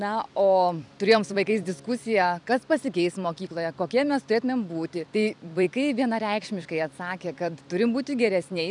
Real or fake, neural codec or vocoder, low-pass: fake; vocoder, 44.1 kHz, 128 mel bands every 256 samples, BigVGAN v2; 10.8 kHz